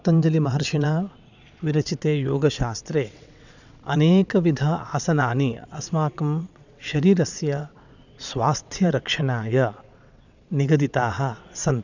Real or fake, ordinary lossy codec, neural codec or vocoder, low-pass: fake; none; vocoder, 22.05 kHz, 80 mel bands, WaveNeXt; 7.2 kHz